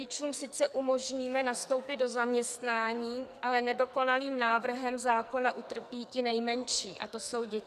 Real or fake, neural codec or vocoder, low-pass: fake; codec, 44.1 kHz, 2.6 kbps, SNAC; 14.4 kHz